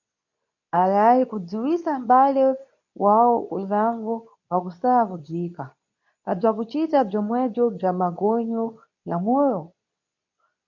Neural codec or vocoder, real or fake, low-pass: codec, 24 kHz, 0.9 kbps, WavTokenizer, medium speech release version 2; fake; 7.2 kHz